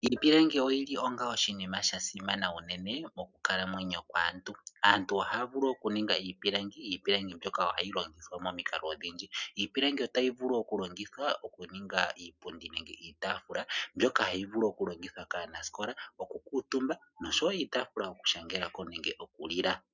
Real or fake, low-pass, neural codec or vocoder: real; 7.2 kHz; none